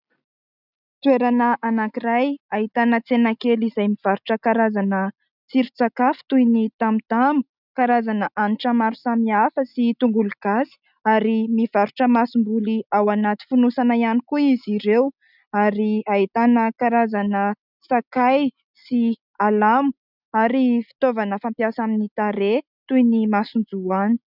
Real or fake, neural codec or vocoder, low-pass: real; none; 5.4 kHz